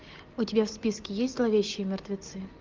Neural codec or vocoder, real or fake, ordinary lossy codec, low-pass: none; real; Opus, 24 kbps; 7.2 kHz